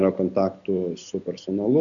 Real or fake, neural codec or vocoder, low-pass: real; none; 7.2 kHz